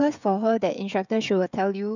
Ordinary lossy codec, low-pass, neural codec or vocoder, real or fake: none; 7.2 kHz; codec, 16 kHz, 16 kbps, FreqCodec, smaller model; fake